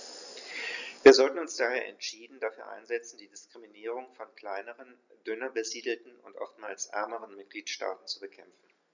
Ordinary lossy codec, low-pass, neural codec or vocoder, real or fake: none; 7.2 kHz; none; real